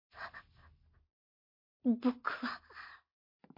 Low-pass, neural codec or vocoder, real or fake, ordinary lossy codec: 5.4 kHz; none; real; MP3, 32 kbps